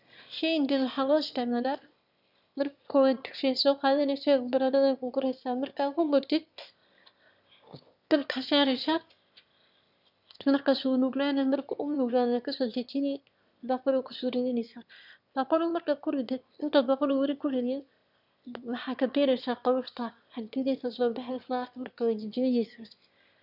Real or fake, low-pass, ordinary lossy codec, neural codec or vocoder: fake; 5.4 kHz; none; autoencoder, 22.05 kHz, a latent of 192 numbers a frame, VITS, trained on one speaker